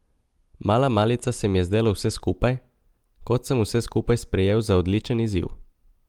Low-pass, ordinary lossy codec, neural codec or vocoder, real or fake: 19.8 kHz; Opus, 24 kbps; none; real